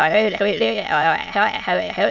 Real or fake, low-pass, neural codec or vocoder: fake; 7.2 kHz; autoencoder, 22.05 kHz, a latent of 192 numbers a frame, VITS, trained on many speakers